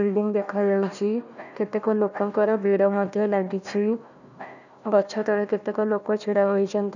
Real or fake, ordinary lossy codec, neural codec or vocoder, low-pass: fake; none; codec, 16 kHz, 1 kbps, FunCodec, trained on Chinese and English, 50 frames a second; 7.2 kHz